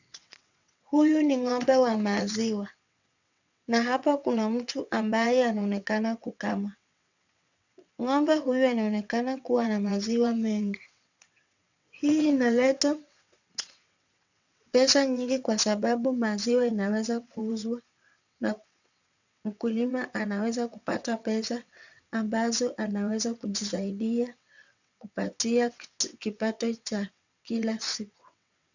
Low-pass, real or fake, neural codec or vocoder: 7.2 kHz; fake; vocoder, 22.05 kHz, 80 mel bands, WaveNeXt